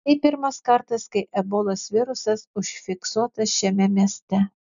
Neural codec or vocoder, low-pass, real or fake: none; 7.2 kHz; real